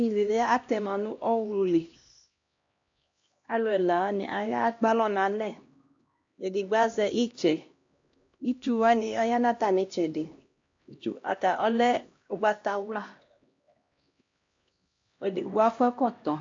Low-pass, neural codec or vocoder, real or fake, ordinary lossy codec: 7.2 kHz; codec, 16 kHz, 1 kbps, X-Codec, HuBERT features, trained on LibriSpeech; fake; AAC, 48 kbps